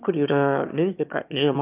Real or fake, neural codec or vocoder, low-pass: fake; autoencoder, 22.05 kHz, a latent of 192 numbers a frame, VITS, trained on one speaker; 3.6 kHz